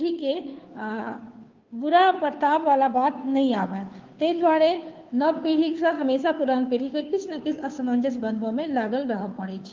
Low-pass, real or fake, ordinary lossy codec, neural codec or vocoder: 7.2 kHz; fake; Opus, 32 kbps; codec, 16 kHz, 2 kbps, FunCodec, trained on Chinese and English, 25 frames a second